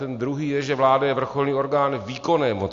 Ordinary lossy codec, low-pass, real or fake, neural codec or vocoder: AAC, 48 kbps; 7.2 kHz; real; none